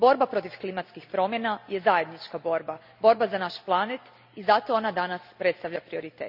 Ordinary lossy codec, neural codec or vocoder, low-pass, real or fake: none; none; 5.4 kHz; real